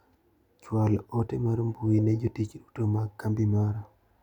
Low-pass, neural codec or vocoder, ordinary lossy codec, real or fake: 19.8 kHz; none; none; real